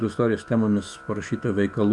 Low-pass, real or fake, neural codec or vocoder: 10.8 kHz; fake; autoencoder, 48 kHz, 128 numbers a frame, DAC-VAE, trained on Japanese speech